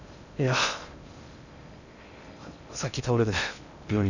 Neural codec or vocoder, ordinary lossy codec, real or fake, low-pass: codec, 16 kHz in and 24 kHz out, 0.6 kbps, FocalCodec, streaming, 2048 codes; none; fake; 7.2 kHz